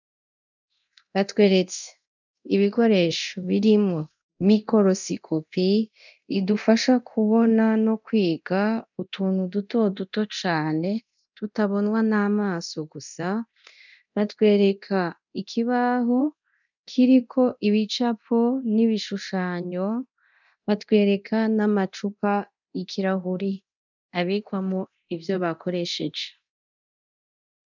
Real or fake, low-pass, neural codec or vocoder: fake; 7.2 kHz; codec, 24 kHz, 0.9 kbps, DualCodec